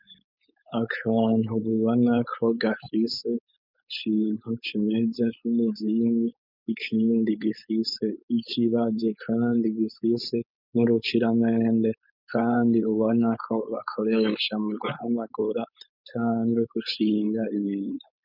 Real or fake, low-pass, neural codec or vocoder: fake; 5.4 kHz; codec, 16 kHz, 4.8 kbps, FACodec